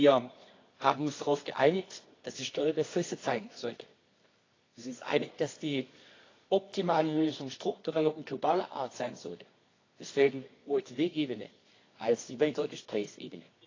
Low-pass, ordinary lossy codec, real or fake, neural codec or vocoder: 7.2 kHz; AAC, 32 kbps; fake; codec, 24 kHz, 0.9 kbps, WavTokenizer, medium music audio release